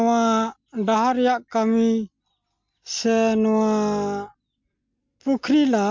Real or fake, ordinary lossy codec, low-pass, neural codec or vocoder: real; MP3, 64 kbps; 7.2 kHz; none